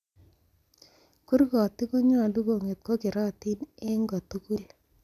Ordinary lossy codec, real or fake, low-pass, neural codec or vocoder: none; real; 14.4 kHz; none